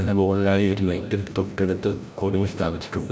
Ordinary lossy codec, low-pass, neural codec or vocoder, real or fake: none; none; codec, 16 kHz, 0.5 kbps, FreqCodec, larger model; fake